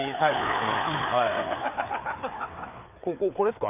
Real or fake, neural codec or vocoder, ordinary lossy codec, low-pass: fake; codec, 16 kHz, 4 kbps, FreqCodec, larger model; none; 3.6 kHz